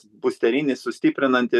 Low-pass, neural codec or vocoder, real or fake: 14.4 kHz; none; real